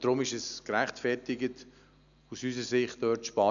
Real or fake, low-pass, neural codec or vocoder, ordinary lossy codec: real; 7.2 kHz; none; none